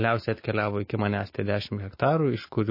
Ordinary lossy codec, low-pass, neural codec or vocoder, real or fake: MP3, 24 kbps; 5.4 kHz; autoencoder, 48 kHz, 128 numbers a frame, DAC-VAE, trained on Japanese speech; fake